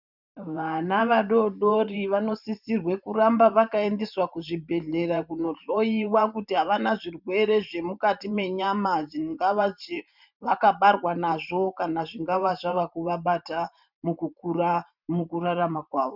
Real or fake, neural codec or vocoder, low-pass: fake; vocoder, 44.1 kHz, 128 mel bands every 512 samples, BigVGAN v2; 5.4 kHz